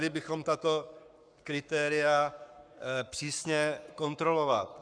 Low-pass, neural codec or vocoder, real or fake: 9.9 kHz; codec, 44.1 kHz, 7.8 kbps, Pupu-Codec; fake